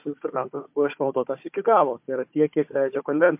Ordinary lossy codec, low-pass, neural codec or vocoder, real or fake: MP3, 32 kbps; 3.6 kHz; codec, 16 kHz, 4 kbps, FunCodec, trained on Chinese and English, 50 frames a second; fake